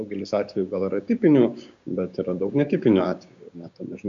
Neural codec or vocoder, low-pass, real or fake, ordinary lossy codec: codec, 16 kHz, 6 kbps, DAC; 7.2 kHz; fake; MP3, 64 kbps